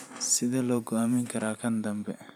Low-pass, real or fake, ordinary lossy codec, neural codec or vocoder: 19.8 kHz; fake; none; vocoder, 44.1 kHz, 128 mel bands every 512 samples, BigVGAN v2